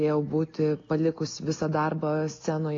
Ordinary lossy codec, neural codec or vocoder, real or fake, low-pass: AAC, 32 kbps; none; real; 7.2 kHz